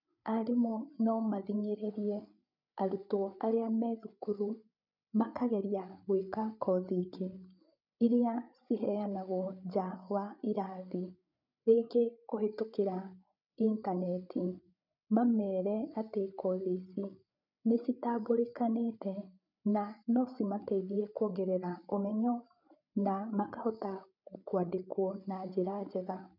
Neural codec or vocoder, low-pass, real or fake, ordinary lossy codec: codec, 16 kHz, 8 kbps, FreqCodec, larger model; 5.4 kHz; fake; none